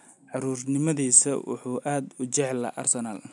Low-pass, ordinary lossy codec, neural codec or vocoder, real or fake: 10.8 kHz; MP3, 96 kbps; none; real